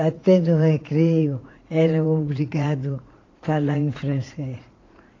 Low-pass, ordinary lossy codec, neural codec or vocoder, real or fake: 7.2 kHz; AAC, 32 kbps; vocoder, 44.1 kHz, 80 mel bands, Vocos; fake